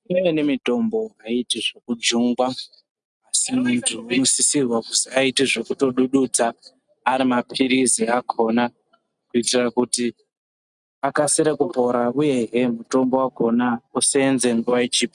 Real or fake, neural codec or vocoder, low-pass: real; none; 10.8 kHz